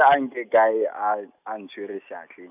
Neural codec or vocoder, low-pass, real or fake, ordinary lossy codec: none; 3.6 kHz; real; none